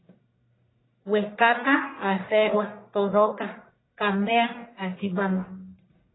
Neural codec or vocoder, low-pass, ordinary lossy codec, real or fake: codec, 44.1 kHz, 1.7 kbps, Pupu-Codec; 7.2 kHz; AAC, 16 kbps; fake